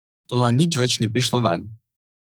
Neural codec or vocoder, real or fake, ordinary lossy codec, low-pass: codec, 44.1 kHz, 2.6 kbps, SNAC; fake; none; none